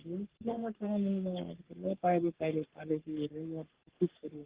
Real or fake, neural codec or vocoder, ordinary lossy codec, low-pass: fake; codec, 44.1 kHz, 3.4 kbps, Pupu-Codec; Opus, 16 kbps; 3.6 kHz